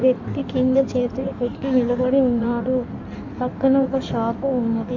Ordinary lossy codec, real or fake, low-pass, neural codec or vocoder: Opus, 64 kbps; fake; 7.2 kHz; codec, 16 kHz in and 24 kHz out, 1.1 kbps, FireRedTTS-2 codec